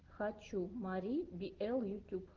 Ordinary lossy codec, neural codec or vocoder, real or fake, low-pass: Opus, 16 kbps; none; real; 7.2 kHz